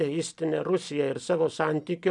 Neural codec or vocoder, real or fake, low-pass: none; real; 10.8 kHz